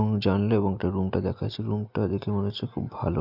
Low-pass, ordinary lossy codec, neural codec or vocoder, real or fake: 5.4 kHz; none; none; real